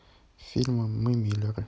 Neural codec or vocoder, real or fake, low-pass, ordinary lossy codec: none; real; none; none